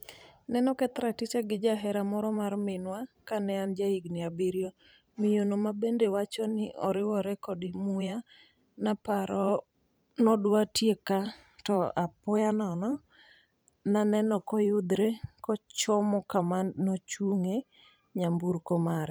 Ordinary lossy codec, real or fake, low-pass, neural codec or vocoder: none; fake; none; vocoder, 44.1 kHz, 128 mel bands every 512 samples, BigVGAN v2